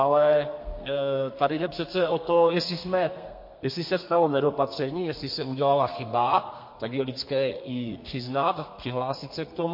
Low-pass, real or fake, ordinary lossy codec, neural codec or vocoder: 5.4 kHz; fake; MP3, 32 kbps; codec, 32 kHz, 1.9 kbps, SNAC